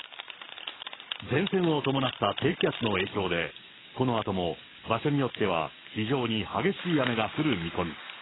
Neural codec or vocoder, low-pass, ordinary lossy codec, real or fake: codec, 44.1 kHz, 7.8 kbps, DAC; 7.2 kHz; AAC, 16 kbps; fake